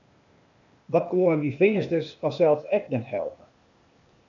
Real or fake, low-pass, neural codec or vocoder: fake; 7.2 kHz; codec, 16 kHz, 0.8 kbps, ZipCodec